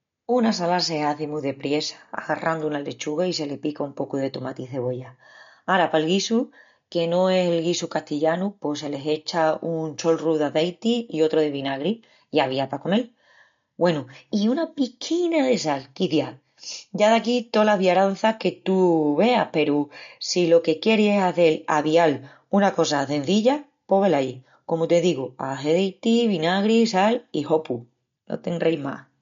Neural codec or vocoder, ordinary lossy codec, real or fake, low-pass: none; MP3, 48 kbps; real; 7.2 kHz